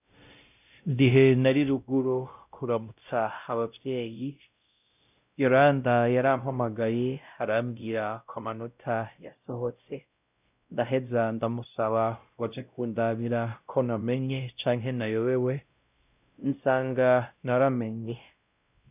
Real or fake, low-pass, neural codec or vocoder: fake; 3.6 kHz; codec, 16 kHz, 0.5 kbps, X-Codec, WavLM features, trained on Multilingual LibriSpeech